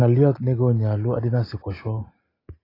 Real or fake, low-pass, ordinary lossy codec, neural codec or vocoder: real; 5.4 kHz; AAC, 24 kbps; none